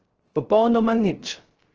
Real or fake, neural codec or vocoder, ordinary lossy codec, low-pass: fake; codec, 16 kHz, 0.3 kbps, FocalCodec; Opus, 16 kbps; 7.2 kHz